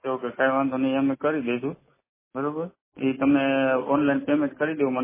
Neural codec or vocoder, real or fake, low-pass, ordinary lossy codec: none; real; 3.6 kHz; MP3, 16 kbps